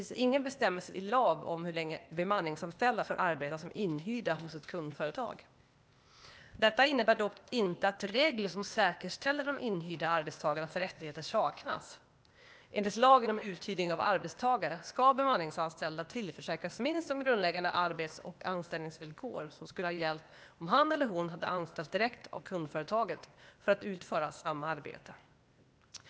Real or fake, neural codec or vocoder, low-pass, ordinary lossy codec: fake; codec, 16 kHz, 0.8 kbps, ZipCodec; none; none